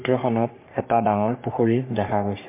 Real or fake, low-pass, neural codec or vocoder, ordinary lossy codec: fake; 3.6 kHz; codec, 44.1 kHz, 3.4 kbps, Pupu-Codec; MP3, 24 kbps